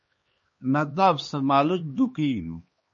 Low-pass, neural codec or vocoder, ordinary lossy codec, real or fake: 7.2 kHz; codec, 16 kHz, 2 kbps, X-Codec, HuBERT features, trained on LibriSpeech; MP3, 32 kbps; fake